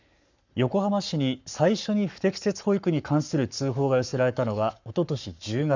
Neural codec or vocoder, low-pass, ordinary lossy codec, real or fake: codec, 44.1 kHz, 7.8 kbps, Pupu-Codec; 7.2 kHz; none; fake